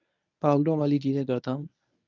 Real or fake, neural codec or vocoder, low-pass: fake; codec, 24 kHz, 0.9 kbps, WavTokenizer, medium speech release version 1; 7.2 kHz